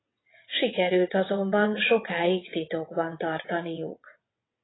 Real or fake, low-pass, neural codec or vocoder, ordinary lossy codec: fake; 7.2 kHz; vocoder, 22.05 kHz, 80 mel bands, WaveNeXt; AAC, 16 kbps